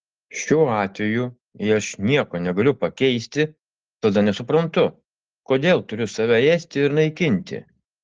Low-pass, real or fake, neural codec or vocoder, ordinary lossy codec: 7.2 kHz; real; none; Opus, 16 kbps